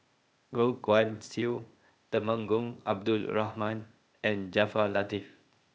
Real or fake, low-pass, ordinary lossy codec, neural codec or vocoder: fake; none; none; codec, 16 kHz, 0.8 kbps, ZipCodec